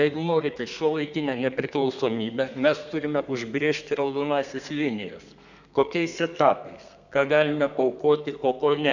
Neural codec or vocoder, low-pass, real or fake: codec, 32 kHz, 1.9 kbps, SNAC; 7.2 kHz; fake